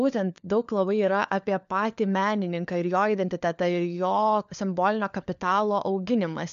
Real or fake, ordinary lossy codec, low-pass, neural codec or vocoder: fake; AAC, 96 kbps; 7.2 kHz; codec, 16 kHz, 4 kbps, FunCodec, trained on LibriTTS, 50 frames a second